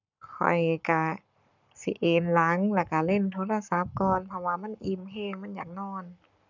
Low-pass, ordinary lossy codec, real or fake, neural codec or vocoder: 7.2 kHz; none; fake; codec, 44.1 kHz, 7.8 kbps, Pupu-Codec